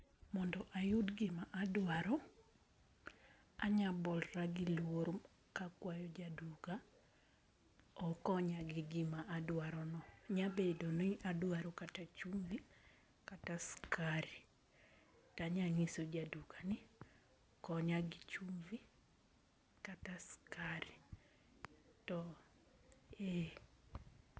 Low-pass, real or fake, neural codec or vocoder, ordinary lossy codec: none; real; none; none